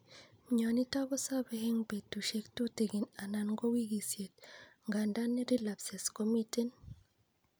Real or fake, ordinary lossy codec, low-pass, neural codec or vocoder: real; none; none; none